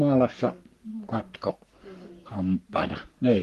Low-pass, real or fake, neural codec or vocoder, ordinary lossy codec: 14.4 kHz; fake; codec, 44.1 kHz, 3.4 kbps, Pupu-Codec; Opus, 24 kbps